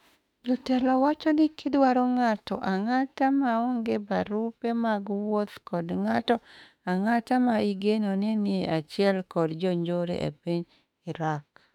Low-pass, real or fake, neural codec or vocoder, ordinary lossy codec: 19.8 kHz; fake; autoencoder, 48 kHz, 32 numbers a frame, DAC-VAE, trained on Japanese speech; none